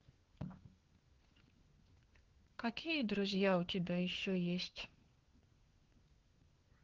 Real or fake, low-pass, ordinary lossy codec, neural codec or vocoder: fake; 7.2 kHz; Opus, 16 kbps; codec, 16 kHz, 4 kbps, FunCodec, trained on LibriTTS, 50 frames a second